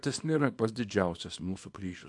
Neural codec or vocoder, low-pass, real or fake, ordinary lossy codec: codec, 24 kHz, 0.9 kbps, WavTokenizer, small release; 10.8 kHz; fake; AAC, 64 kbps